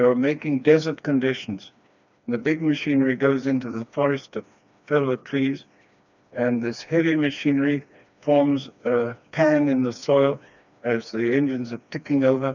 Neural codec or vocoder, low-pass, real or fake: codec, 16 kHz, 2 kbps, FreqCodec, smaller model; 7.2 kHz; fake